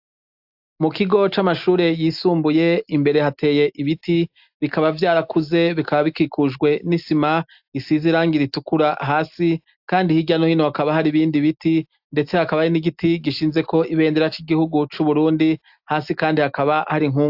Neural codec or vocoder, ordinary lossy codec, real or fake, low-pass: none; AAC, 48 kbps; real; 5.4 kHz